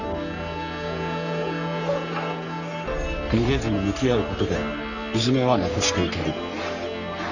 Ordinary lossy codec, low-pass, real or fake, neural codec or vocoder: none; 7.2 kHz; fake; codec, 44.1 kHz, 3.4 kbps, Pupu-Codec